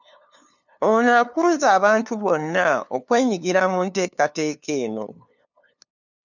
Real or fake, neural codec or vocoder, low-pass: fake; codec, 16 kHz, 2 kbps, FunCodec, trained on LibriTTS, 25 frames a second; 7.2 kHz